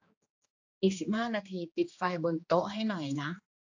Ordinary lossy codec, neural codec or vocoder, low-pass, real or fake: AAC, 48 kbps; codec, 16 kHz, 2 kbps, X-Codec, HuBERT features, trained on general audio; 7.2 kHz; fake